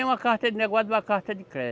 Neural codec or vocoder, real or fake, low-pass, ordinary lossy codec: none; real; none; none